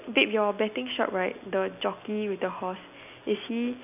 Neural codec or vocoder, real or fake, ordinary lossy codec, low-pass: none; real; none; 3.6 kHz